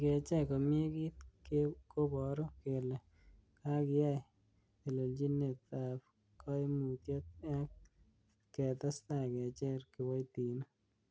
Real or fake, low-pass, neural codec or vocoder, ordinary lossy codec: real; none; none; none